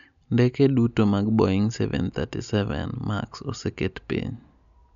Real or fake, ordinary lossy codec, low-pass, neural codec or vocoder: real; none; 7.2 kHz; none